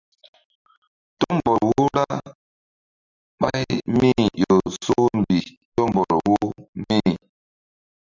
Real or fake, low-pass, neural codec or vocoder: real; 7.2 kHz; none